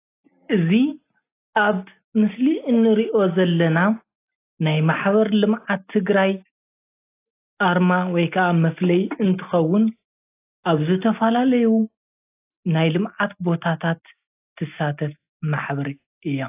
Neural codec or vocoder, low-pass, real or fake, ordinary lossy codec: none; 3.6 kHz; real; AAC, 24 kbps